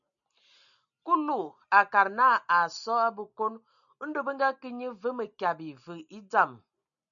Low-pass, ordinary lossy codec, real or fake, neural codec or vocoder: 7.2 kHz; MP3, 96 kbps; real; none